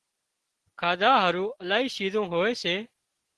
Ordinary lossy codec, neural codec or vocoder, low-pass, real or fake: Opus, 16 kbps; none; 10.8 kHz; real